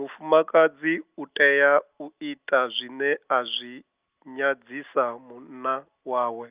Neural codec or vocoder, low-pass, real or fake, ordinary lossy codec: none; 3.6 kHz; real; Opus, 24 kbps